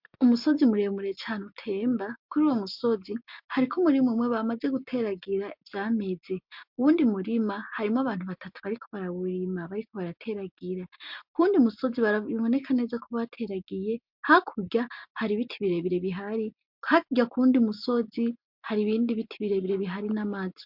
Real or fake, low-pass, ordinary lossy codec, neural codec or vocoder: real; 5.4 kHz; MP3, 48 kbps; none